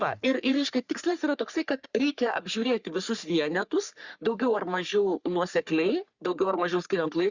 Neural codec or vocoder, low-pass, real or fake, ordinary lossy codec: codec, 44.1 kHz, 3.4 kbps, Pupu-Codec; 7.2 kHz; fake; Opus, 64 kbps